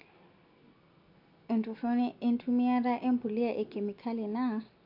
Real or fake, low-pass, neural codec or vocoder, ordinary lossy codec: real; 5.4 kHz; none; MP3, 48 kbps